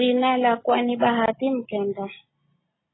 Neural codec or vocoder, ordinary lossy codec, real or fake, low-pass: none; AAC, 16 kbps; real; 7.2 kHz